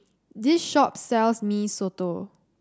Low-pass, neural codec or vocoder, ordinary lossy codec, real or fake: none; none; none; real